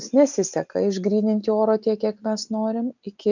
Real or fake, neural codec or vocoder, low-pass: real; none; 7.2 kHz